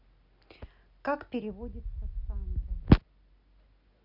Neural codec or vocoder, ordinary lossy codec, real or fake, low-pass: none; none; real; 5.4 kHz